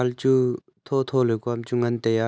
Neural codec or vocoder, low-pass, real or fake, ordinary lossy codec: none; none; real; none